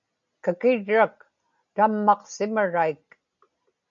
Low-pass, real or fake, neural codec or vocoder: 7.2 kHz; real; none